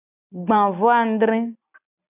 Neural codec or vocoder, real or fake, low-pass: none; real; 3.6 kHz